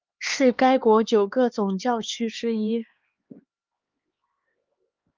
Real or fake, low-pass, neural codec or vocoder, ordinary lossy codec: fake; 7.2 kHz; codec, 16 kHz, 2 kbps, X-Codec, HuBERT features, trained on LibriSpeech; Opus, 32 kbps